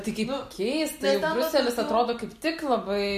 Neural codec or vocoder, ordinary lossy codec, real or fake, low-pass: none; MP3, 64 kbps; real; 14.4 kHz